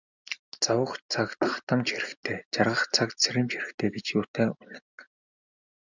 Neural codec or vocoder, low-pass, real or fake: none; 7.2 kHz; real